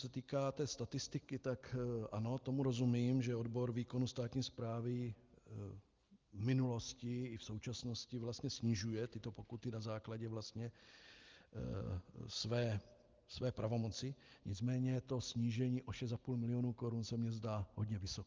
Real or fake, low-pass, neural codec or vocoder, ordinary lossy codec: real; 7.2 kHz; none; Opus, 32 kbps